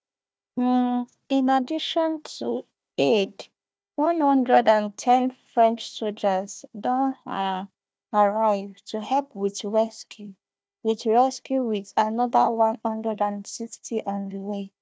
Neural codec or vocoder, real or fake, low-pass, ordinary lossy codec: codec, 16 kHz, 1 kbps, FunCodec, trained on Chinese and English, 50 frames a second; fake; none; none